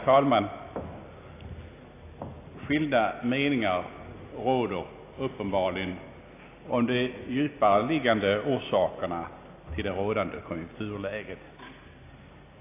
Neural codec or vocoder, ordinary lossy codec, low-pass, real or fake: none; AAC, 24 kbps; 3.6 kHz; real